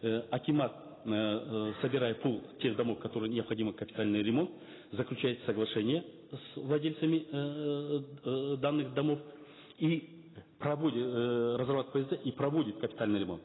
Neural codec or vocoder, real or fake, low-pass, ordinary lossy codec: none; real; 7.2 kHz; AAC, 16 kbps